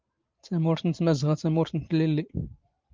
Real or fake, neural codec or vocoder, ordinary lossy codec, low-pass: fake; vocoder, 44.1 kHz, 128 mel bands every 512 samples, BigVGAN v2; Opus, 32 kbps; 7.2 kHz